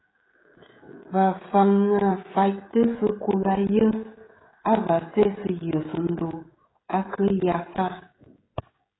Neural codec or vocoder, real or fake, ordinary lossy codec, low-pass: codec, 16 kHz, 16 kbps, FreqCodec, smaller model; fake; AAC, 16 kbps; 7.2 kHz